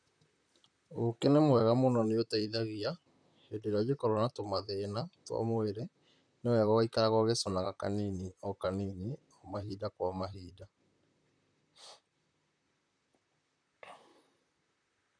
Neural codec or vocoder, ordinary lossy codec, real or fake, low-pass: vocoder, 44.1 kHz, 128 mel bands, Pupu-Vocoder; none; fake; 9.9 kHz